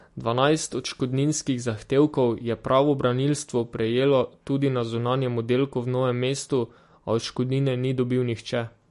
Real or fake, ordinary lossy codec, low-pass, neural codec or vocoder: real; MP3, 48 kbps; 14.4 kHz; none